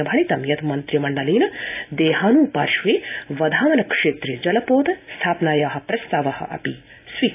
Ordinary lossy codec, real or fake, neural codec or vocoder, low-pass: AAC, 24 kbps; real; none; 3.6 kHz